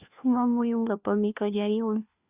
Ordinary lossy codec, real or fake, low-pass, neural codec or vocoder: Opus, 64 kbps; fake; 3.6 kHz; codec, 16 kHz, 1 kbps, FunCodec, trained on LibriTTS, 50 frames a second